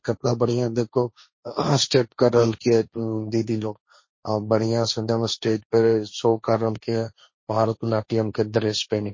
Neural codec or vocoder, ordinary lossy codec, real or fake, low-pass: codec, 16 kHz, 1.1 kbps, Voila-Tokenizer; MP3, 32 kbps; fake; 7.2 kHz